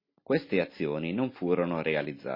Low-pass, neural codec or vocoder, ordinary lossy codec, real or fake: 5.4 kHz; none; MP3, 24 kbps; real